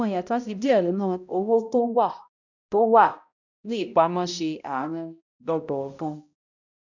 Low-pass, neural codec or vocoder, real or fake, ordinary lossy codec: 7.2 kHz; codec, 16 kHz, 0.5 kbps, X-Codec, HuBERT features, trained on balanced general audio; fake; none